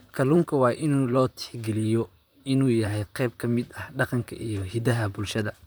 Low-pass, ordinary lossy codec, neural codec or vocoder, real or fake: none; none; vocoder, 44.1 kHz, 128 mel bands, Pupu-Vocoder; fake